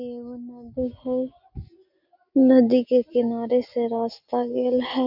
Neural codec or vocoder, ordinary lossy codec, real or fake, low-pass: none; none; real; 5.4 kHz